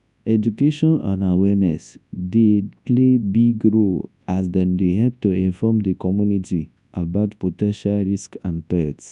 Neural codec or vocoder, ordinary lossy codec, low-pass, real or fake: codec, 24 kHz, 0.9 kbps, WavTokenizer, large speech release; none; 10.8 kHz; fake